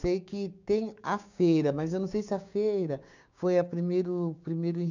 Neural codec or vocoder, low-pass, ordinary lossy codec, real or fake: codec, 16 kHz, 6 kbps, DAC; 7.2 kHz; none; fake